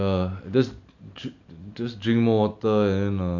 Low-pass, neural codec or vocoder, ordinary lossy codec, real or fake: 7.2 kHz; none; none; real